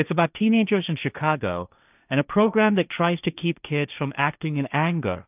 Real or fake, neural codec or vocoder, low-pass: fake; codec, 16 kHz, 1.1 kbps, Voila-Tokenizer; 3.6 kHz